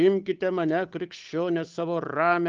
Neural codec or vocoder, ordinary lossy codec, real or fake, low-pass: codec, 16 kHz, 8 kbps, FunCodec, trained on Chinese and English, 25 frames a second; Opus, 32 kbps; fake; 7.2 kHz